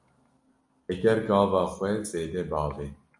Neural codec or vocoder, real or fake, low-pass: none; real; 10.8 kHz